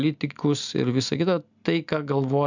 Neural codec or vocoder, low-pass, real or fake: none; 7.2 kHz; real